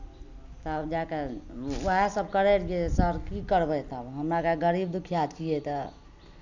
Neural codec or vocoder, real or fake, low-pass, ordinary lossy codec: none; real; 7.2 kHz; none